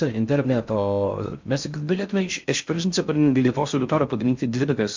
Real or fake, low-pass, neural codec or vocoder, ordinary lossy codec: fake; 7.2 kHz; codec, 16 kHz in and 24 kHz out, 0.6 kbps, FocalCodec, streaming, 2048 codes; MP3, 64 kbps